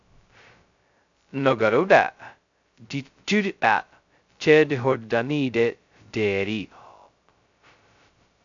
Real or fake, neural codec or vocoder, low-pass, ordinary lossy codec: fake; codec, 16 kHz, 0.2 kbps, FocalCodec; 7.2 kHz; AAC, 64 kbps